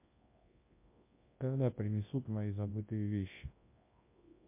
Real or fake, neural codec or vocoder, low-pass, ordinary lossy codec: fake; codec, 24 kHz, 0.9 kbps, WavTokenizer, large speech release; 3.6 kHz; MP3, 24 kbps